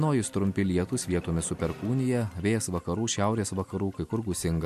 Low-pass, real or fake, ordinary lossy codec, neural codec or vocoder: 14.4 kHz; real; MP3, 64 kbps; none